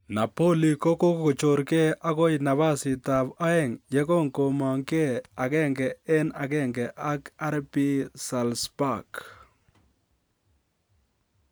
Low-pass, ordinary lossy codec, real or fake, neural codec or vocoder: none; none; real; none